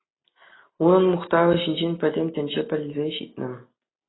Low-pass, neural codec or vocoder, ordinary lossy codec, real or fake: 7.2 kHz; none; AAC, 16 kbps; real